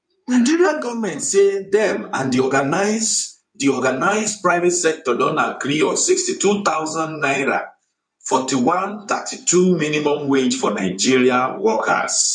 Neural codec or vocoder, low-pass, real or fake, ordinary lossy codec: codec, 16 kHz in and 24 kHz out, 2.2 kbps, FireRedTTS-2 codec; 9.9 kHz; fake; none